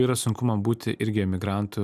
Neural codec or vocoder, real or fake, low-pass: none; real; 14.4 kHz